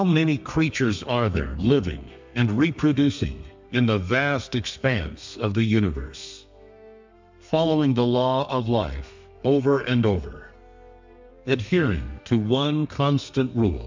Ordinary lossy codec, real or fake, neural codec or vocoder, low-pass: MP3, 64 kbps; fake; codec, 32 kHz, 1.9 kbps, SNAC; 7.2 kHz